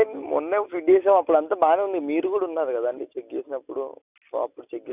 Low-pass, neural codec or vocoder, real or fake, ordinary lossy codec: 3.6 kHz; none; real; none